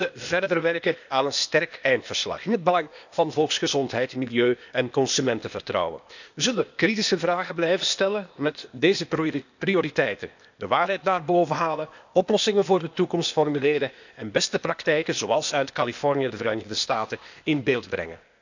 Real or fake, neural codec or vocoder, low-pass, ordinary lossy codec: fake; codec, 16 kHz, 0.8 kbps, ZipCodec; 7.2 kHz; none